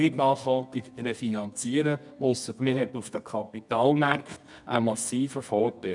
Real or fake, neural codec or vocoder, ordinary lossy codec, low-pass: fake; codec, 24 kHz, 0.9 kbps, WavTokenizer, medium music audio release; none; 10.8 kHz